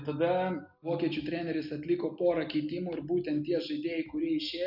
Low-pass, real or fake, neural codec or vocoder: 5.4 kHz; real; none